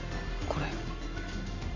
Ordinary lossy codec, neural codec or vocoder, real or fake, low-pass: AAC, 32 kbps; none; real; 7.2 kHz